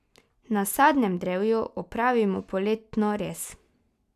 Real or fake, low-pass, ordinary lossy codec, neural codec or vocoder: real; 14.4 kHz; none; none